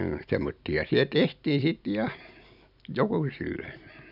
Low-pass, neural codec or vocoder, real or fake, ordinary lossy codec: 5.4 kHz; none; real; none